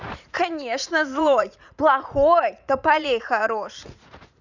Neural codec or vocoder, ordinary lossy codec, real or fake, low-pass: vocoder, 44.1 kHz, 80 mel bands, Vocos; none; fake; 7.2 kHz